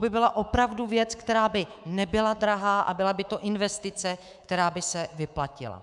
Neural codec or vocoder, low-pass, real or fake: codec, 24 kHz, 3.1 kbps, DualCodec; 10.8 kHz; fake